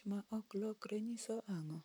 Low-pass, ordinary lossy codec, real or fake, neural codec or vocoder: none; none; fake; vocoder, 44.1 kHz, 128 mel bands, Pupu-Vocoder